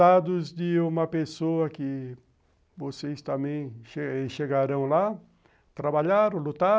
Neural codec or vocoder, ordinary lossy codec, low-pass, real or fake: none; none; none; real